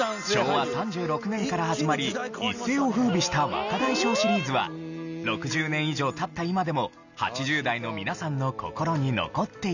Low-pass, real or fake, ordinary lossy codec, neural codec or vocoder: 7.2 kHz; real; none; none